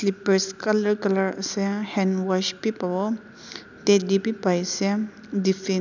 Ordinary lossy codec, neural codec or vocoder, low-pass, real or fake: none; none; 7.2 kHz; real